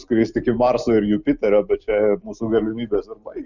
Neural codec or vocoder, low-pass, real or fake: none; 7.2 kHz; real